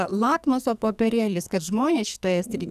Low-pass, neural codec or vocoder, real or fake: 14.4 kHz; codec, 44.1 kHz, 2.6 kbps, SNAC; fake